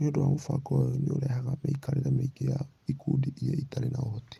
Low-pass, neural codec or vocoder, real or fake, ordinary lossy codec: 14.4 kHz; none; real; Opus, 32 kbps